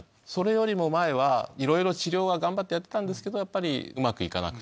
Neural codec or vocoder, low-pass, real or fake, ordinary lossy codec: none; none; real; none